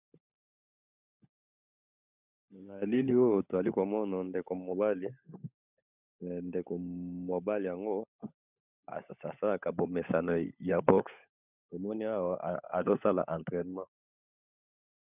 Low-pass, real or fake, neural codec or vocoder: 3.6 kHz; fake; codec, 16 kHz, 16 kbps, FunCodec, trained on LibriTTS, 50 frames a second